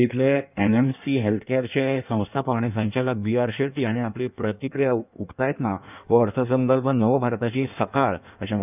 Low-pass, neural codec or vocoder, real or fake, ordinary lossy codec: 3.6 kHz; codec, 16 kHz in and 24 kHz out, 1.1 kbps, FireRedTTS-2 codec; fake; none